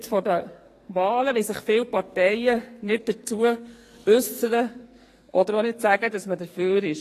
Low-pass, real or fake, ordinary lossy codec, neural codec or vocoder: 14.4 kHz; fake; AAC, 48 kbps; codec, 44.1 kHz, 2.6 kbps, SNAC